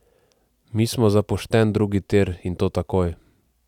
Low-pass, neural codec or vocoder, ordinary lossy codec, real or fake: 19.8 kHz; none; none; real